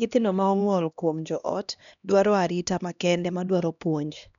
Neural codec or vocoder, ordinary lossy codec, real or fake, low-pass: codec, 16 kHz, 1 kbps, X-Codec, HuBERT features, trained on LibriSpeech; none; fake; 7.2 kHz